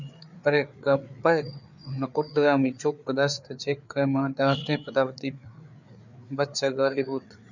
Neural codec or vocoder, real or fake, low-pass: codec, 16 kHz, 4 kbps, FreqCodec, larger model; fake; 7.2 kHz